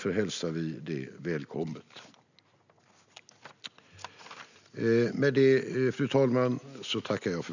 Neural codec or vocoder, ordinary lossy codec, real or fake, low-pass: none; none; real; 7.2 kHz